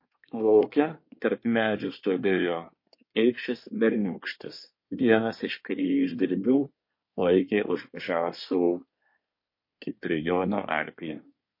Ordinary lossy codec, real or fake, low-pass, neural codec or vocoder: MP3, 32 kbps; fake; 5.4 kHz; codec, 24 kHz, 1 kbps, SNAC